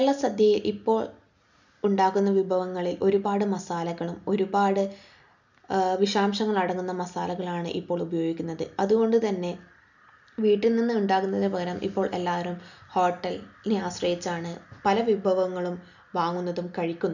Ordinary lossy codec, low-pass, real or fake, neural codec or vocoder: none; 7.2 kHz; real; none